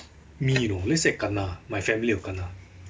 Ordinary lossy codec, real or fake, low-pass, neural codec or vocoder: none; real; none; none